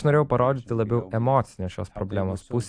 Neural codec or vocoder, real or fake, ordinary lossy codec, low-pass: none; real; AAC, 64 kbps; 9.9 kHz